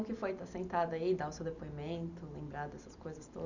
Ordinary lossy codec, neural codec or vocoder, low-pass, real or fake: none; none; 7.2 kHz; real